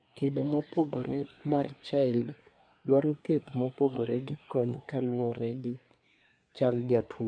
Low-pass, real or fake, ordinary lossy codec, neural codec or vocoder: 9.9 kHz; fake; none; codec, 24 kHz, 1 kbps, SNAC